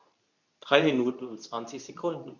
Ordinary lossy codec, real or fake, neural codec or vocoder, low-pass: none; fake; codec, 24 kHz, 0.9 kbps, WavTokenizer, medium speech release version 2; 7.2 kHz